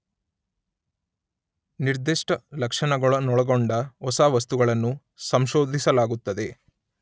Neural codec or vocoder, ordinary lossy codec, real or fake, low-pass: none; none; real; none